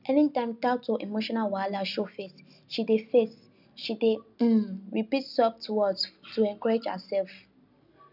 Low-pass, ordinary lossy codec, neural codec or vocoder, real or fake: 5.4 kHz; none; none; real